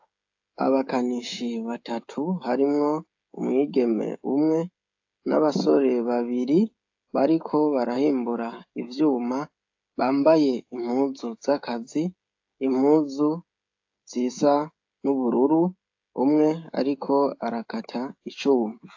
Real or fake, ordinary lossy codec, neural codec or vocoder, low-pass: fake; AAC, 48 kbps; codec, 16 kHz, 16 kbps, FreqCodec, smaller model; 7.2 kHz